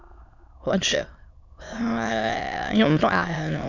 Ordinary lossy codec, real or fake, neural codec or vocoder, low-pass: none; fake; autoencoder, 22.05 kHz, a latent of 192 numbers a frame, VITS, trained on many speakers; 7.2 kHz